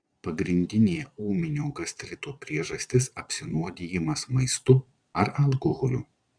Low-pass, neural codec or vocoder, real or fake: 9.9 kHz; vocoder, 22.05 kHz, 80 mel bands, Vocos; fake